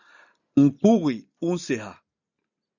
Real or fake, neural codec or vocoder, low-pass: real; none; 7.2 kHz